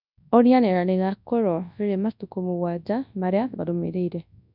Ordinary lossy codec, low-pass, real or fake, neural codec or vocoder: none; 5.4 kHz; fake; codec, 24 kHz, 0.9 kbps, WavTokenizer, large speech release